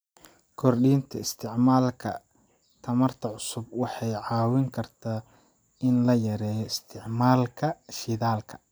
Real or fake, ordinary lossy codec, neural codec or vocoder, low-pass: real; none; none; none